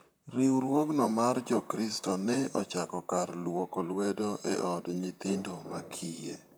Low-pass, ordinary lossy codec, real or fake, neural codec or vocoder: none; none; fake; vocoder, 44.1 kHz, 128 mel bands, Pupu-Vocoder